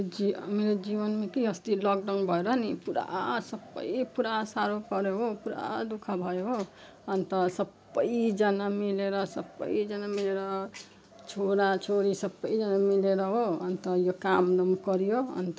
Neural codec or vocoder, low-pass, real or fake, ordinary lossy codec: none; none; real; none